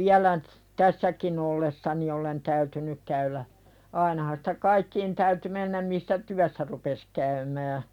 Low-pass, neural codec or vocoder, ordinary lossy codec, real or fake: 19.8 kHz; none; none; real